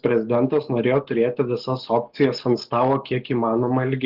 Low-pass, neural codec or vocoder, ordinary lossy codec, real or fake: 5.4 kHz; none; Opus, 16 kbps; real